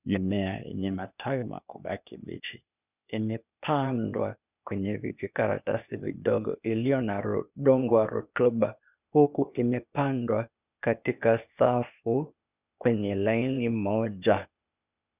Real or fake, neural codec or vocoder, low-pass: fake; codec, 16 kHz, 0.8 kbps, ZipCodec; 3.6 kHz